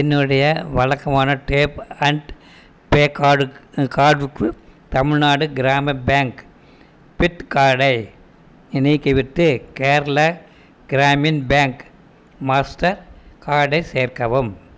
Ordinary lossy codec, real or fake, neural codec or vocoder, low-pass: none; real; none; none